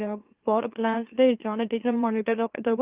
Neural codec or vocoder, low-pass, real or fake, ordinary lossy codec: autoencoder, 44.1 kHz, a latent of 192 numbers a frame, MeloTTS; 3.6 kHz; fake; Opus, 32 kbps